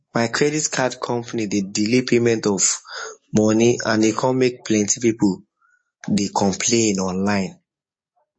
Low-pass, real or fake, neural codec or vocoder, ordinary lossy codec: 9.9 kHz; fake; codec, 44.1 kHz, 7.8 kbps, DAC; MP3, 32 kbps